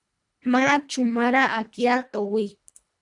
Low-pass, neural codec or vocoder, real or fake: 10.8 kHz; codec, 24 kHz, 1.5 kbps, HILCodec; fake